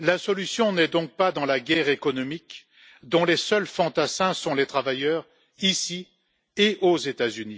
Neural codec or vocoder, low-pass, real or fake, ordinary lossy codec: none; none; real; none